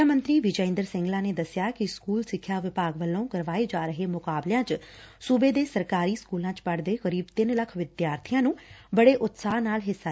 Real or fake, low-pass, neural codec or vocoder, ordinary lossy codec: real; none; none; none